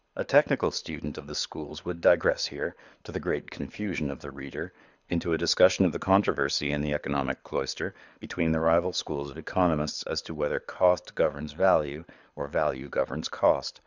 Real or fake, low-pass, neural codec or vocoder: fake; 7.2 kHz; codec, 24 kHz, 6 kbps, HILCodec